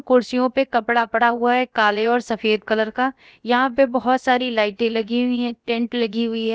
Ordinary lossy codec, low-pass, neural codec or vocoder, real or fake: none; none; codec, 16 kHz, about 1 kbps, DyCAST, with the encoder's durations; fake